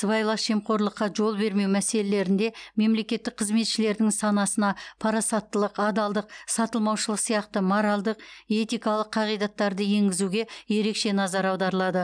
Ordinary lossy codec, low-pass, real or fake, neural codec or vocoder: none; 9.9 kHz; real; none